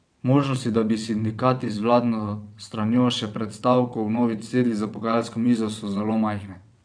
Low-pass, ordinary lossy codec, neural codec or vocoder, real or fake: 9.9 kHz; none; vocoder, 22.05 kHz, 80 mel bands, WaveNeXt; fake